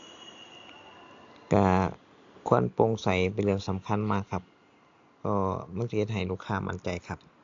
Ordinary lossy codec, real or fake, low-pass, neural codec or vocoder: AAC, 48 kbps; real; 7.2 kHz; none